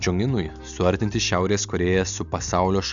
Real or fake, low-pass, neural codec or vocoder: real; 7.2 kHz; none